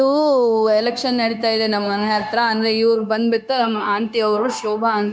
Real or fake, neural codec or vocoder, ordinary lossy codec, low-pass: fake; codec, 16 kHz, 0.9 kbps, LongCat-Audio-Codec; none; none